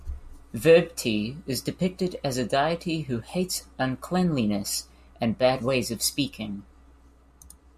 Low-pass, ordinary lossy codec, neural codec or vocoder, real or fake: 14.4 kHz; MP3, 64 kbps; none; real